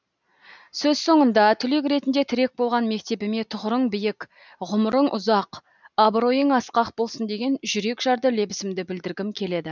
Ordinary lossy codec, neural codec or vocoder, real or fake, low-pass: none; none; real; 7.2 kHz